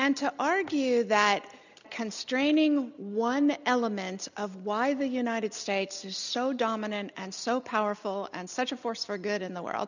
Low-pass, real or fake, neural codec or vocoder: 7.2 kHz; real; none